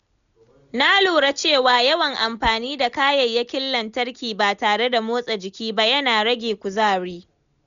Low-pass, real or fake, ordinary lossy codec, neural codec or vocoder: 7.2 kHz; real; none; none